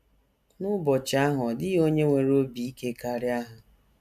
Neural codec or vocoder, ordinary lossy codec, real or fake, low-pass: none; none; real; 14.4 kHz